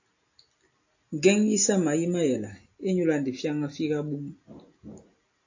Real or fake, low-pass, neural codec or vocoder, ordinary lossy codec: real; 7.2 kHz; none; AAC, 48 kbps